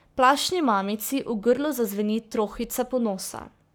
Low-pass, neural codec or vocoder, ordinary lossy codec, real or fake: none; codec, 44.1 kHz, 7.8 kbps, Pupu-Codec; none; fake